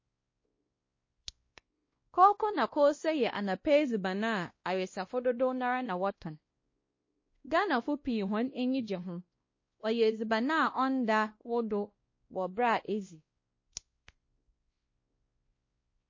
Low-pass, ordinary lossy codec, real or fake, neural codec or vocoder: 7.2 kHz; MP3, 32 kbps; fake; codec, 16 kHz, 1 kbps, X-Codec, WavLM features, trained on Multilingual LibriSpeech